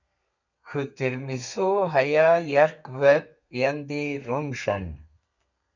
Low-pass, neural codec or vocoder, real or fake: 7.2 kHz; codec, 32 kHz, 1.9 kbps, SNAC; fake